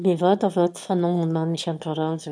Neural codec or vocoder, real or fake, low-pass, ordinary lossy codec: autoencoder, 22.05 kHz, a latent of 192 numbers a frame, VITS, trained on one speaker; fake; none; none